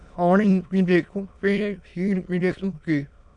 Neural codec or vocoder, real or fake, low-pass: autoencoder, 22.05 kHz, a latent of 192 numbers a frame, VITS, trained on many speakers; fake; 9.9 kHz